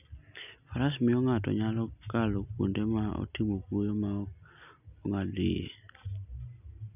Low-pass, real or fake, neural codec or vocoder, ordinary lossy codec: 3.6 kHz; real; none; none